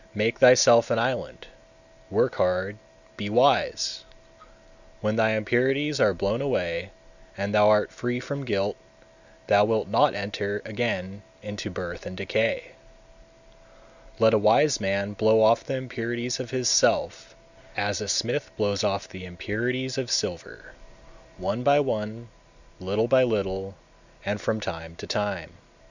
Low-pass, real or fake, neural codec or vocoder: 7.2 kHz; real; none